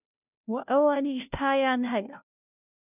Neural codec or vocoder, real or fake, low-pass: codec, 16 kHz, 0.5 kbps, FunCodec, trained on Chinese and English, 25 frames a second; fake; 3.6 kHz